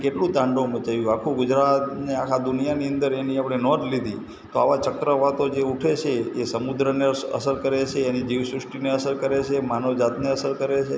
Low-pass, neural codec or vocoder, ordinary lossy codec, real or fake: none; none; none; real